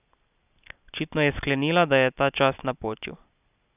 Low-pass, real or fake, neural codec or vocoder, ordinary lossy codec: 3.6 kHz; real; none; none